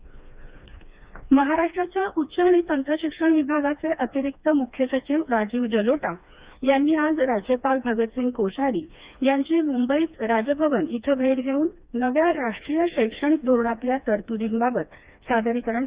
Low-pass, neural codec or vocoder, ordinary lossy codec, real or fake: 3.6 kHz; codec, 16 kHz, 2 kbps, FreqCodec, smaller model; Opus, 64 kbps; fake